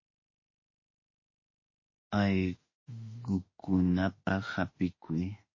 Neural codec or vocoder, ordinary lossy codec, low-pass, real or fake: autoencoder, 48 kHz, 32 numbers a frame, DAC-VAE, trained on Japanese speech; MP3, 32 kbps; 7.2 kHz; fake